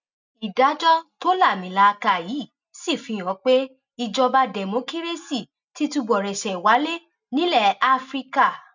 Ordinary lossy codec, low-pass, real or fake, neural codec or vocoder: none; 7.2 kHz; real; none